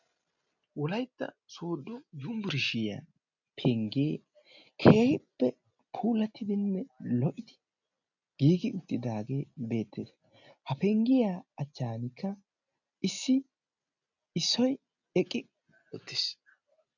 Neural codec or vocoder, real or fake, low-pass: none; real; 7.2 kHz